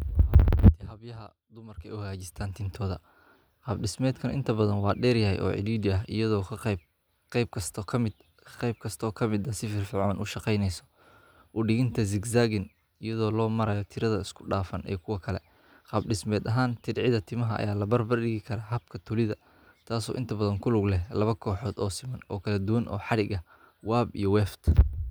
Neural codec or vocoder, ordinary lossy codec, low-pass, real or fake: none; none; none; real